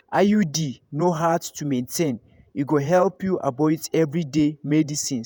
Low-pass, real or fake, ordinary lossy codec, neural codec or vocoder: none; fake; none; vocoder, 48 kHz, 128 mel bands, Vocos